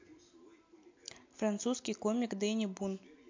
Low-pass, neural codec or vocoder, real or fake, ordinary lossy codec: 7.2 kHz; none; real; MP3, 48 kbps